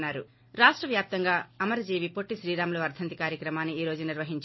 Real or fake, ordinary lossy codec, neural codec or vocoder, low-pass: real; MP3, 24 kbps; none; 7.2 kHz